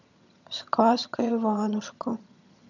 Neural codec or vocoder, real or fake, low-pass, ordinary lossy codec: vocoder, 22.05 kHz, 80 mel bands, HiFi-GAN; fake; 7.2 kHz; none